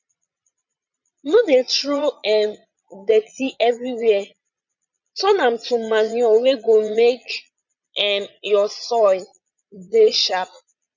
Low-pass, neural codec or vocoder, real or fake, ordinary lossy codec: 7.2 kHz; vocoder, 22.05 kHz, 80 mel bands, Vocos; fake; none